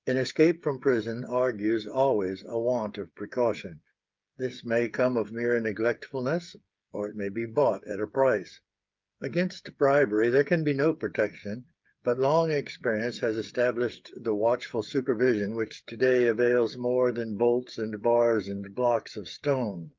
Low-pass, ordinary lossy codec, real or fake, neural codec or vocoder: 7.2 kHz; Opus, 24 kbps; fake; codec, 16 kHz, 8 kbps, FreqCodec, smaller model